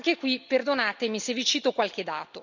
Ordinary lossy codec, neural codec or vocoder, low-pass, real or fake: none; none; 7.2 kHz; real